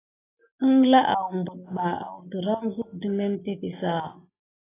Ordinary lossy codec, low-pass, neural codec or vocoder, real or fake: AAC, 16 kbps; 3.6 kHz; none; real